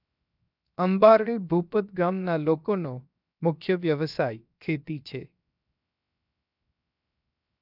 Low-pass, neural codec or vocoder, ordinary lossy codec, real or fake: 5.4 kHz; codec, 16 kHz, 0.7 kbps, FocalCodec; none; fake